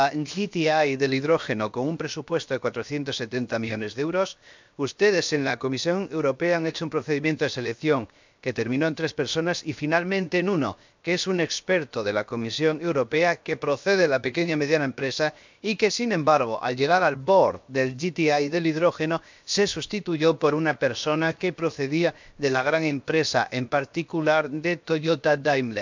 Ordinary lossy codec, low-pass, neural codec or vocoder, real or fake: MP3, 64 kbps; 7.2 kHz; codec, 16 kHz, 0.7 kbps, FocalCodec; fake